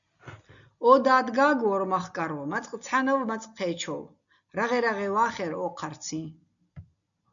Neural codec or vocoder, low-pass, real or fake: none; 7.2 kHz; real